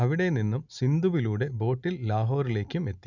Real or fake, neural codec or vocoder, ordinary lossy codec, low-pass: real; none; none; 7.2 kHz